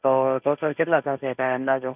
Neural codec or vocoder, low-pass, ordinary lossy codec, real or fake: codec, 16 kHz, 1.1 kbps, Voila-Tokenizer; 3.6 kHz; none; fake